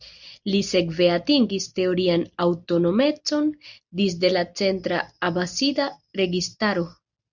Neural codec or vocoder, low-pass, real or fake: none; 7.2 kHz; real